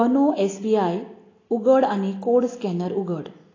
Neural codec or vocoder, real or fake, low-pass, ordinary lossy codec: none; real; 7.2 kHz; AAC, 32 kbps